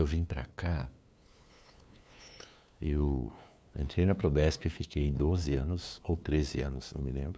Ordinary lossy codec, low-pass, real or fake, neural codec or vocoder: none; none; fake; codec, 16 kHz, 2 kbps, FunCodec, trained on LibriTTS, 25 frames a second